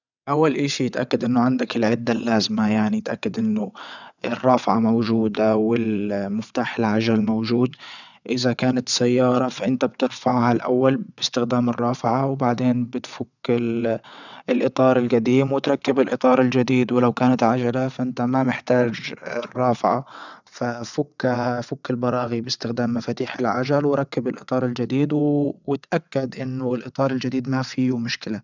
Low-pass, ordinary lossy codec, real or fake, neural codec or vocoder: 7.2 kHz; none; fake; vocoder, 22.05 kHz, 80 mel bands, WaveNeXt